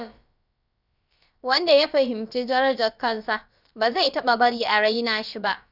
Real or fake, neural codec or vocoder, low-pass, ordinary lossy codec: fake; codec, 16 kHz, about 1 kbps, DyCAST, with the encoder's durations; 5.4 kHz; none